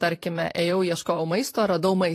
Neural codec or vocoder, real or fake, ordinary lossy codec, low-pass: vocoder, 44.1 kHz, 128 mel bands, Pupu-Vocoder; fake; AAC, 48 kbps; 14.4 kHz